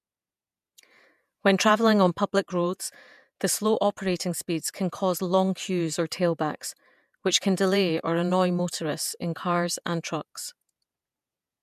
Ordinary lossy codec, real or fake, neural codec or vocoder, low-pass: MP3, 96 kbps; fake; vocoder, 48 kHz, 128 mel bands, Vocos; 14.4 kHz